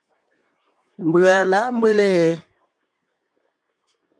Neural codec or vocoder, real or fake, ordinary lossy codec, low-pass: codec, 24 kHz, 1 kbps, SNAC; fake; MP3, 64 kbps; 9.9 kHz